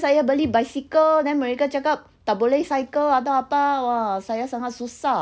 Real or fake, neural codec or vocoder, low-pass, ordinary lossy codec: real; none; none; none